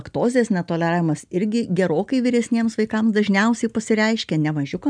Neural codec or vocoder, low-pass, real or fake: none; 9.9 kHz; real